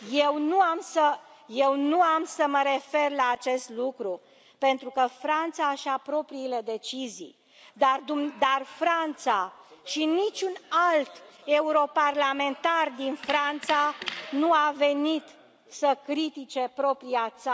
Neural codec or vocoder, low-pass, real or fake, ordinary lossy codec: none; none; real; none